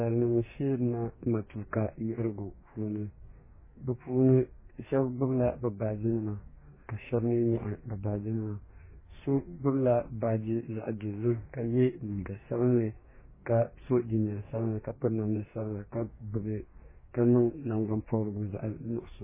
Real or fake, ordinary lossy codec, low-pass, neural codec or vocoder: fake; MP3, 16 kbps; 3.6 kHz; codec, 44.1 kHz, 2.6 kbps, DAC